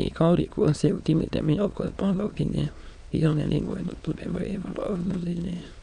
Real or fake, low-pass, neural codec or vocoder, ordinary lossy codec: fake; 9.9 kHz; autoencoder, 22.05 kHz, a latent of 192 numbers a frame, VITS, trained on many speakers; none